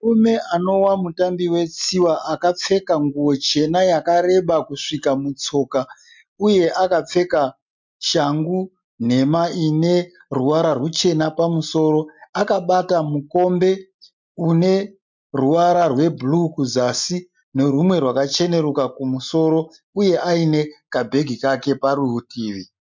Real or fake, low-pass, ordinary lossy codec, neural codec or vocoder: real; 7.2 kHz; MP3, 64 kbps; none